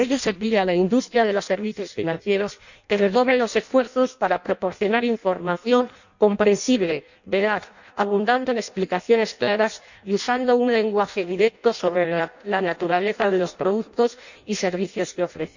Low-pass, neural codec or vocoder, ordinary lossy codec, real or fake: 7.2 kHz; codec, 16 kHz in and 24 kHz out, 0.6 kbps, FireRedTTS-2 codec; none; fake